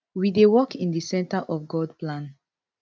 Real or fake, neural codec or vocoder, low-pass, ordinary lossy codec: real; none; none; none